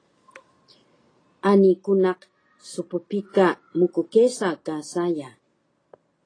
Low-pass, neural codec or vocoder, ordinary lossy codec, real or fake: 9.9 kHz; none; AAC, 32 kbps; real